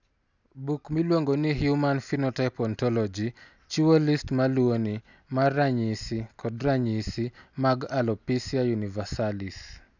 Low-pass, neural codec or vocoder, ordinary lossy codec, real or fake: 7.2 kHz; none; none; real